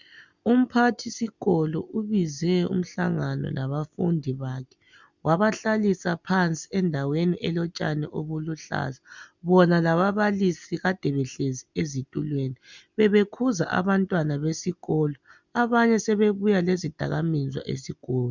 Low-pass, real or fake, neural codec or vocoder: 7.2 kHz; real; none